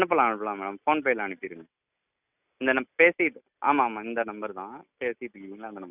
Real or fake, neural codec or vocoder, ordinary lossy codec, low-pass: real; none; none; 3.6 kHz